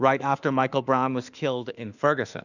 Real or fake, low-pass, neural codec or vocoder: fake; 7.2 kHz; autoencoder, 48 kHz, 32 numbers a frame, DAC-VAE, trained on Japanese speech